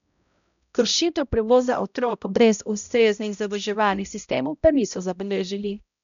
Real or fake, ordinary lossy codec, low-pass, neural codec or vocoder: fake; none; 7.2 kHz; codec, 16 kHz, 0.5 kbps, X-Codec, HuBERT features, trained on balanced general audio